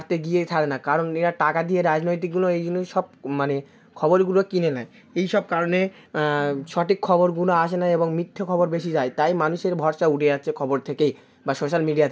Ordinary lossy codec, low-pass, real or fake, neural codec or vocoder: none; none; real; none